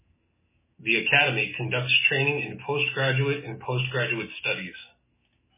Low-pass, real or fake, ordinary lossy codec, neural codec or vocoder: 3.6 kHz; fake; MP3, 16 kbps; autoencoder, 48 kHz, 128 numbers a frame, DAC-VAE, trained on Japanese speech